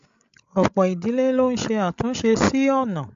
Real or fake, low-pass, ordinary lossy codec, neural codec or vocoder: fake; 7.2 kHz; AAC, 64 kbps; codec, 16 kHz, 16 kbps, FreqCodec, larger model